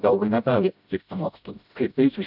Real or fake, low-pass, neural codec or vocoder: fake; 5.4 kHz; codec, 16 kHz, 0.5 kbps, FreqCodec, smaller model